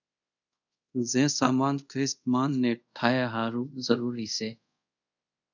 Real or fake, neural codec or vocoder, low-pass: fake; codec, 24 kHz, 0.5 kbps, DualCodec; 7.2 kHz